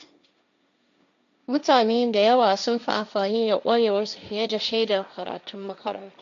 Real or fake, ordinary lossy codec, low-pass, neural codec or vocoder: fake; MP3, 64 kbps; 7.2 kHz; codec, 16 kHz, 1.1 kbps, Voila-Tokenizer